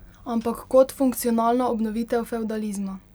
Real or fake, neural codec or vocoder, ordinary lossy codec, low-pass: real; none; none; none